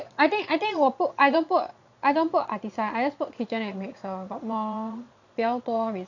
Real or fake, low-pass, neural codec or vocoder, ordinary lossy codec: fake; 7.2 kHz; vocoder, 22.05 kHz, 80 mel bands, Vocos; none